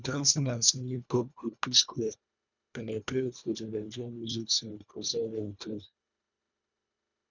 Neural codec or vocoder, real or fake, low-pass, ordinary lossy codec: codec, 24 kHz, 1.5 kbps, HILCodec; fake; 7.2 kHz; none